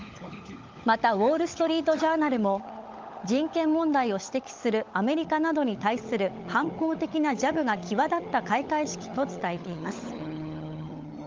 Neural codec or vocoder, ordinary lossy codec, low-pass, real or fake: codec, 16 kHz, 16 kbps, FunCodec, trained on LibriTTS, 50 frames a second; Opus, 32 kbps; 7.2 kHz; fake